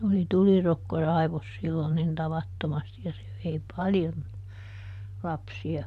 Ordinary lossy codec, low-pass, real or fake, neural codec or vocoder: none; 14.4 kHz; fake; vocoder, 44.1 kHz, 128 mel bands every 512 samples, BigVGAN v2